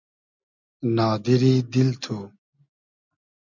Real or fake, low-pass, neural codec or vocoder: real; 7.2 kHz; none